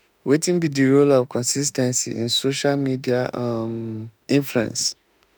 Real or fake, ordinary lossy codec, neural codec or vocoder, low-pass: fake; none; autoencoder, 48 kHz, 32 numbers a frame, DAC-VAE, trained on Japanese speech; none